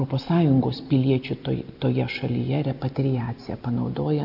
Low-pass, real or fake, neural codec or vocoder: 5.4 kHz; real; none